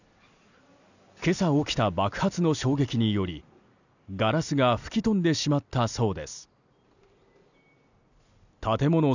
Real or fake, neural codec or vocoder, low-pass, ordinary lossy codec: real; none; 7.2 kHz; none